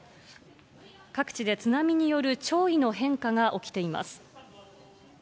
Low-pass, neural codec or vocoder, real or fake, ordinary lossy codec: none; none; real; none